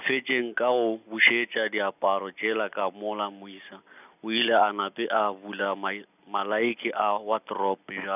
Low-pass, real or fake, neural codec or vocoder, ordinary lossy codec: 3.6 kHz; real; none; none